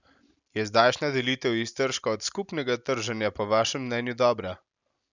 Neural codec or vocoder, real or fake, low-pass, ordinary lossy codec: none; real; 7.2 kHz; none